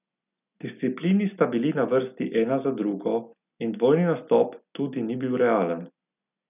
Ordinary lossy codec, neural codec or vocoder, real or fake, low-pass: none; none; real; 3.6 kHz